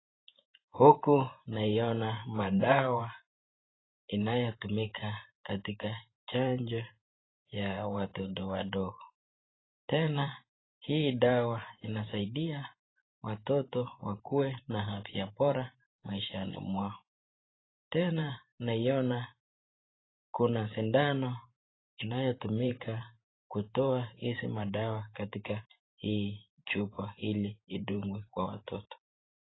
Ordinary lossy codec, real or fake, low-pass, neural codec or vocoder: AAC, 16 kbps; fake; 7.2 kHz; vocoder, 44.1 kHz, 128 mel bands every 512 samples, BigVGAN v2